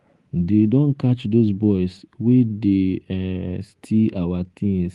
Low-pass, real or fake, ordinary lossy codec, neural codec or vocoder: 10.8 kHz; fake; Opus, 24 kbps; codec, 24 kHz, 3.1 kbps, DualCodec